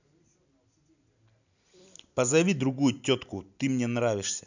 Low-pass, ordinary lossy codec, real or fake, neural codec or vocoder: 7.2 kHz; none; real; none